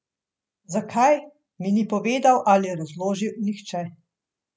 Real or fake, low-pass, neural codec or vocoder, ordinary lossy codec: real; none; none; none